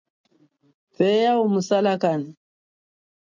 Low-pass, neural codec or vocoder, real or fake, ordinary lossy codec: 7.2 kHz; none; real; MP3, 48 kbps